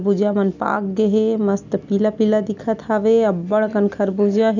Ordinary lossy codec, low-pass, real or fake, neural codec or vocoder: none; 7.2 kHz; real; none